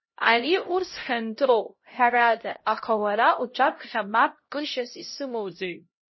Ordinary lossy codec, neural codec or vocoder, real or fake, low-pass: MP3, 24 kbps; codec, 16 kHz, 0.5 kbps, X-Codec, HuBERT features, trained on LibriSpeech; fake; 7.2 kHz